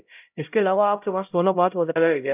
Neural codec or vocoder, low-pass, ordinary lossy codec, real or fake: codec, 16 kHz, 0.5 kbps, X-Codec, HuBERT features, trained on LibriSpeech; 3.6 kHz; none; fake